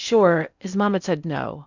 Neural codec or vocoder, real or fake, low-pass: codec, 16 kHz in and 24 kHz out, 0.6 kbps, FocalCodec, streaming, 4096 codes; fake; 7.2 kHz